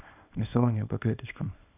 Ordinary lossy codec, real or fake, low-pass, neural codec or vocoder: none; fake; 3.6 kHz; codec, 24 kHz, 0.9 kbps, WavTokenizer, small release